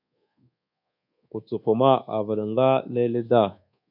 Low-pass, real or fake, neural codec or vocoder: 5.4 kHz; fake; codec, 24 kHz, 1.2 kbps, DualCodec